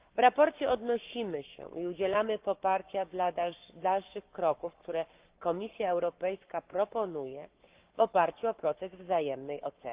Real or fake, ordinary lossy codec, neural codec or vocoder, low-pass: fake; Opus, 24 kbps; codec, 44.1 kHz, 7.8 kbps, Pupu-Codec; 3.6 kHz